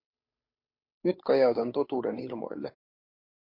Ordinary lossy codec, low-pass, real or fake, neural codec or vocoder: MP3, 32 kbps; 5.4 kHz; fake; codec, 16 kHz, 8 kbps, FunCodec, trained on Chinese and English, 25 frames a second